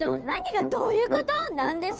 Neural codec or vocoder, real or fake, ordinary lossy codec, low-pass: codec, 16 kHz, 2 kbps, FunCodec, trained on Chinese and English, 25 frames a second; fake; none; none